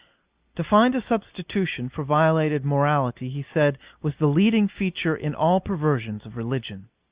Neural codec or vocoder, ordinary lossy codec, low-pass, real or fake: none; Opus, 64 kbps; 3.6 kHz; real